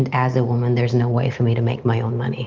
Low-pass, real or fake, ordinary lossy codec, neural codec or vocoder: 7.2 kHz; real; Opus, 32 kbps; none